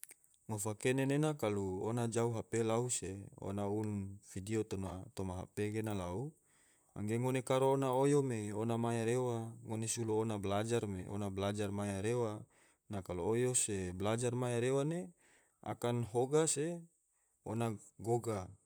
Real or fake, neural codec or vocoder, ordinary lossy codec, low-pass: fake; vocoder, 44.1 kHz, 128 mel bands, Pupu-Vocoder; none; none